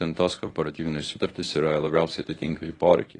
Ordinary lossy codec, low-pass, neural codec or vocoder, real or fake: AAC, 32 kbps; 10.8 kHz; codec, 24 kHz, 0.9 kbps, WavTokenizer, medium speech release version 1; fake